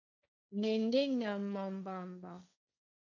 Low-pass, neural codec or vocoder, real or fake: 7.2 kHz; codec, 16 kHz, 1.1 kbps, Voila-Tokenizer; fake